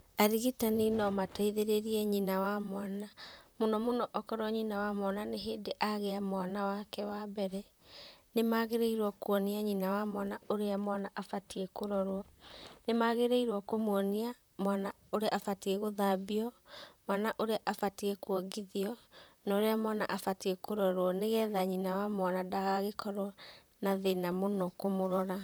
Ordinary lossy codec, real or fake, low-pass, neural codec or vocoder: none; fake; none; vocoder, 44.1 kHz, 128 mel bands, Pupu-Vocoder